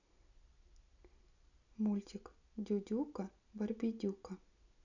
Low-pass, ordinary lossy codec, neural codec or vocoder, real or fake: 7.2 kHz; none; none; real